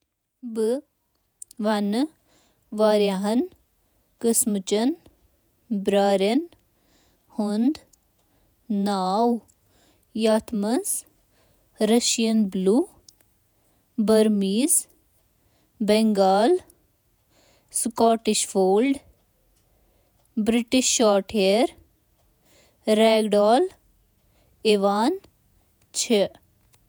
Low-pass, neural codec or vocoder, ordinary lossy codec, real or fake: none; vocoder, 48 kHz, 128 mel bands, Vocos; none; fake